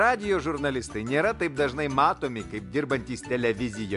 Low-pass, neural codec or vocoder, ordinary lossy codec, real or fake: 10.8 kHz; none; MP3, 64 kbps; real